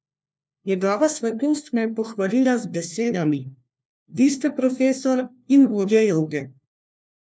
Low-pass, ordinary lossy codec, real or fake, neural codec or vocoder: none; none; fake; codec, 16 kHz, 1 kbps, FunCodec, trained on LibriTTS, 50 frames a second